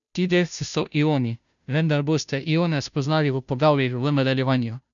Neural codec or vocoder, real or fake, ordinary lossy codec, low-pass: codec, 16 kHz, 0.5 kbps, FunCodec, trained on Chinese and English, 25 frames a second; fake; none; 7.2 kHz